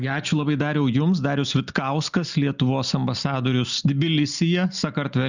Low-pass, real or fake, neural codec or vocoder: 7.2 kHz; real; none